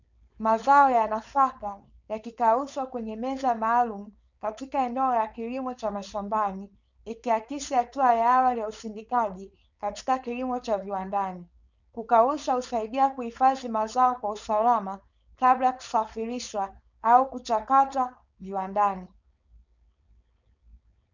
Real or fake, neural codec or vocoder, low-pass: fake; codec, 16 kHz, 4.8 kbps, FACodec; 7.2 kHz